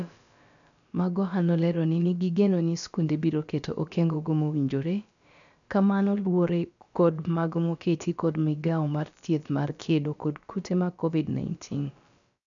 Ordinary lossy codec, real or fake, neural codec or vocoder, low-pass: none; fake; codec, 16 kHz, about 1 kbps, DyCAST, with the encoder's durations; 7.2 kHz